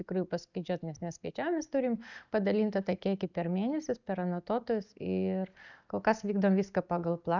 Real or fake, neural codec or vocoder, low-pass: real; none; 7.2 kHz